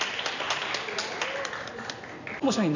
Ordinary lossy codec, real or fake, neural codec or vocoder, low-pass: none; real; none; 7.2 kHz